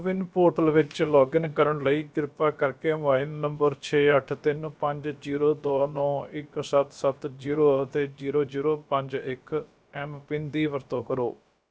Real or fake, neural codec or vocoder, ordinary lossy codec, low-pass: fake; codec, 16 kHz, about 1 kbps, DyCAST, with the encoder's durations; none; none